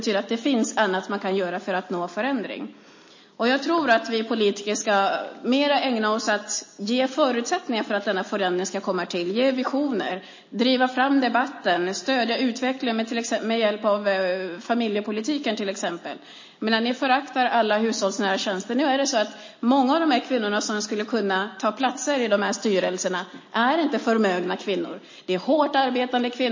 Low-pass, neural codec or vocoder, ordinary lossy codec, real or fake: 7.2 kHz; none; MP3, 32 kbps; real